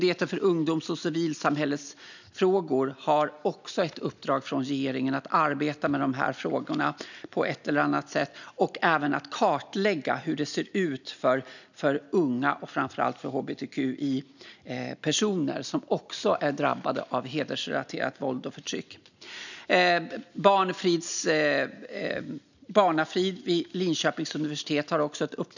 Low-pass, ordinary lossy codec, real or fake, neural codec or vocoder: 7.2 kHz; none; real; none